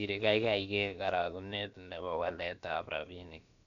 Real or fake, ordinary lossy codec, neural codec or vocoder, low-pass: fake; none; codec, 16 kHz, about 1 kbps, DyCAST, with the encoder's durations; 7.2 kHz